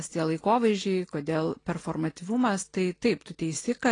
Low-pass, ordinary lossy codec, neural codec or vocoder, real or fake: 9.9 kHz; AAC, 32 kbps; none; real